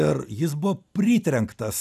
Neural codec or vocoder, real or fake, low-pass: none; real; 14.4 kHz